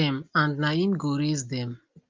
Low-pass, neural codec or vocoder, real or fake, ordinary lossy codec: 7.2 kHz; none; real; Opus, 32 kbps